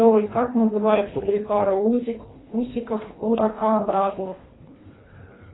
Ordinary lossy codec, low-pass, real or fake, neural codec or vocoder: AAC, 16 kbps; 7.2 kHz; fake; codec, 24 kHz, 1.5 kbps, HILCodec